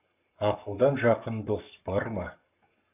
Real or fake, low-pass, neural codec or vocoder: fake; 3.6 kHz; codec, 44.1 kHz, 7.8 kbps, Pupu-Codec